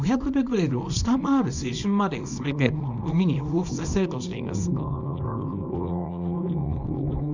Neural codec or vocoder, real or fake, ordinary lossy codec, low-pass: codec, 24 kHz, 0.9 kbps, WavTokenizer, small release; fake; none; 7.2 kHz